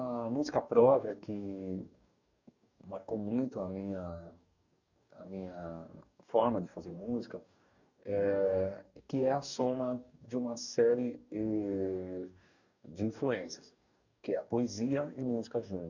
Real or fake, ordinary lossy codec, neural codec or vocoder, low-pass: fake; none; codec, 44.1 kHz, 2.6 kbps, DAC; 7.2 kHz